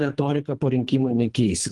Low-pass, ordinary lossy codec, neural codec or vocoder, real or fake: 10.8 kHz; Opus, 24 kbps; codec, 24 kHz, 3 kbps, HILCodec; fake